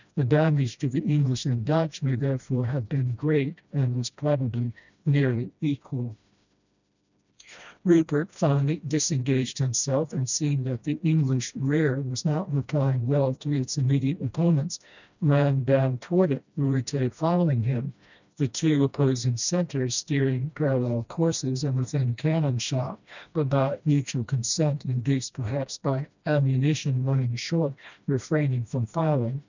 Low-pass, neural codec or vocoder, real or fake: 7.2 kHz; codec, 16 kHz, 1 kbps, FreqCodec, smaller model; fake